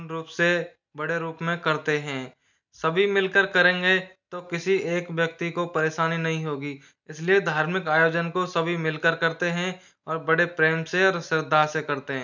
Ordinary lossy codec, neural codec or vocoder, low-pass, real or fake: none; none; 7.2 kHz; real